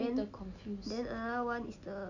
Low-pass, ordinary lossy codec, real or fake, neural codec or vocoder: 7.2 kHz; none; real; none